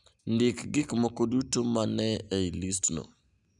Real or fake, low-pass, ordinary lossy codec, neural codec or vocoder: real; 10.8 kHz; none; none